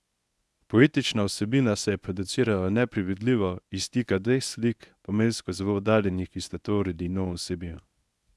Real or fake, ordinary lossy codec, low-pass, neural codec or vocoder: fake; none; none; codec, 24 kHz, 0.9 kbps, WavTokenizer, medium speech release version 1